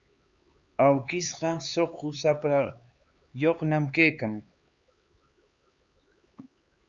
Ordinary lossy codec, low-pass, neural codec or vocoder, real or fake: Opus, 64 kbps; 7.2 kHz; codec, 16 kHz, 4 kbps, X-Codec, HuBERT features, trained on LibriSpeech; fake